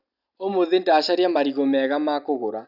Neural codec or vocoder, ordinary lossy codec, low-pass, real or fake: none; none; 5.4 kHz; real